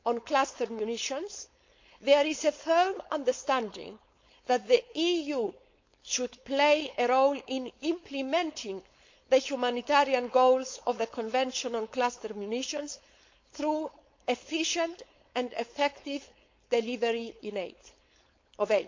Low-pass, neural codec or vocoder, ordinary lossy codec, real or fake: 7.2 kHz; codec, 16 kHz, 4.8 kbps, FACodec; MP3, 48 kbps; fake